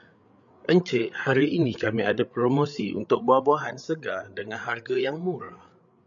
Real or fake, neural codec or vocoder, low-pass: fake; codec, 16 kHz, 8 kbps, FreqCodec, larger model; 7.2 kHz